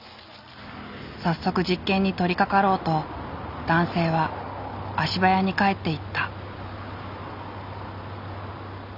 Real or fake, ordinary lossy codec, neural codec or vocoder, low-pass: real; none; none; 5.4 kHz